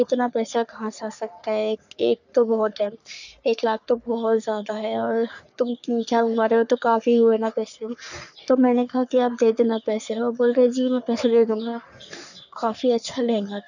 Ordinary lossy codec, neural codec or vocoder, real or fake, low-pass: none; codec, 44.1 kHz, 3.4 kbps, Pupu-Codec; fake; 7.2 kHz